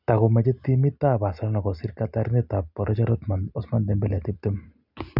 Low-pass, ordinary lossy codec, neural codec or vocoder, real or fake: 5.4 kHz; none; none; real